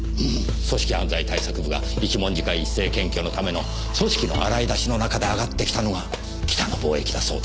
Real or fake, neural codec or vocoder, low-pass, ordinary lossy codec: real; none; none; none